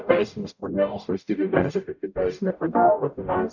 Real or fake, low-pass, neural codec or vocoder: fake; 7.2 kHz; codec, 44.1 kHz, 0.9 kbps, DAC